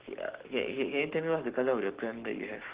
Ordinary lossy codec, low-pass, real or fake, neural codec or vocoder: Opus, 16 kbps; 3.6 kHz; fake; codec, 44.1 kHz, 7.8 kbps, Pupu-Codec